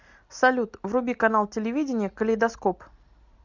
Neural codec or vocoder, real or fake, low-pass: none; real; 7.2 kHz